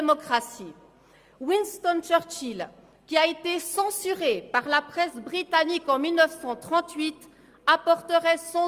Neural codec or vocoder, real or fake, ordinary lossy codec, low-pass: none; real; Opus, 32 kbps; 14.4 kHz